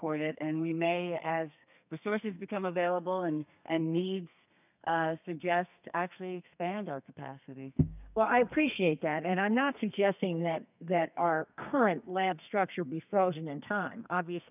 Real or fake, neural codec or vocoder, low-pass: fake; codec, 32 kHz, 1.9 kbps, SNAC; 3.6 kHz